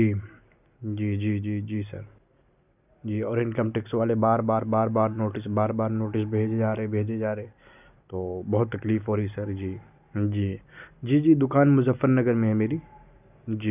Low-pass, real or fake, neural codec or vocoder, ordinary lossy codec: 3.6 kHz; real; none; none